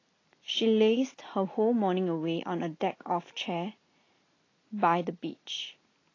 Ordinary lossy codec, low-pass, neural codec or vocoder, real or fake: AAC, 32 kbps; 7.2 kHz; none; real